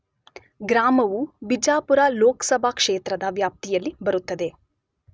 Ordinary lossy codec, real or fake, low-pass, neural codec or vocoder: none; real; none; none